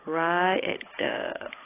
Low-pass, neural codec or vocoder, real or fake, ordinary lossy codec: 3.6 kHz; codec, 16 kHz, 16 kbps, FunCodec, trained on Chinese and English, 50 frames a second; fake; AAC, 16 kbps